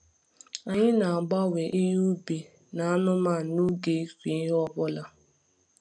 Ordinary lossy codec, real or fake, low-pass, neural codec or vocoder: none; real; 9.9 kHz; none